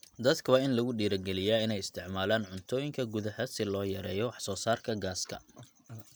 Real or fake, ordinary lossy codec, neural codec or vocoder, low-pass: real; none; none; none